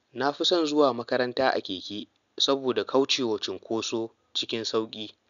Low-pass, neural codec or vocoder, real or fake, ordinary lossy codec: 7.2 kHz; none; real; none